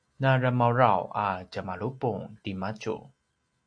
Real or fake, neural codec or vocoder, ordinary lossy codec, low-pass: real; none; AAC, 64 kbps; 9.9 kHz